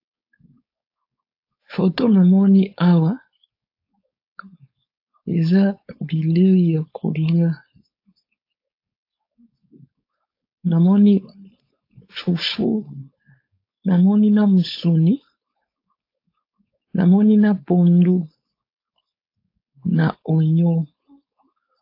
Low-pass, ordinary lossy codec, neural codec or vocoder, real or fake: 5.4 kHz; AAC, 32 kbps; codec, 16 kHz, 4.8 kbps, FACodec; fake